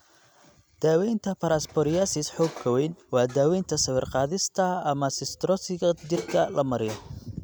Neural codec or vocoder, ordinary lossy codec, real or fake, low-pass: none; none; real; none